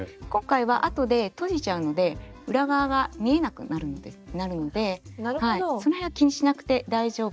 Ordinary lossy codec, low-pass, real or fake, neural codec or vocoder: none; none; real; none